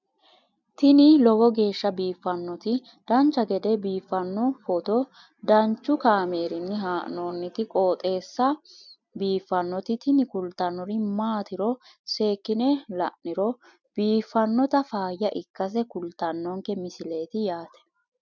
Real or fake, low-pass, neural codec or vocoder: real; 7.2 kHz; none